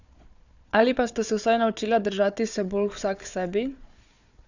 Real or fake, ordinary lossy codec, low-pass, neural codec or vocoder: fake; none; 7.2 kHz; codec, 16 kHz, 4 kbps, FunCodec, trained on Chinese and English, 50 frames a second